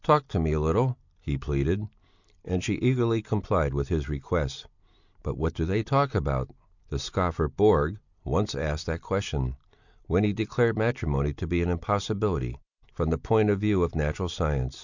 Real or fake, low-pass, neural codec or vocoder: real; 7.2 kHz; none